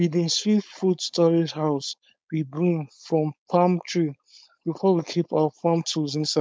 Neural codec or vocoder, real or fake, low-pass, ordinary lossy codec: codec, 16 kHz, 4.8 kbps, FACodec; fake; none; none